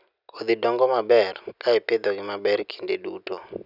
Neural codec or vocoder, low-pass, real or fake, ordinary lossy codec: none; 5.4 kHz; real; none